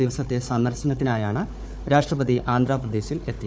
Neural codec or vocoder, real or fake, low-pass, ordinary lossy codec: codec, 16 kHz, 4 kbps, FunCodec, trained on Chinese and English, 50 frames a second; fake; none; none